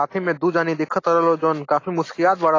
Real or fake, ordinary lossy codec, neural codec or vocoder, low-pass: real; AAC, 32 kbps; none; 7.2 kHz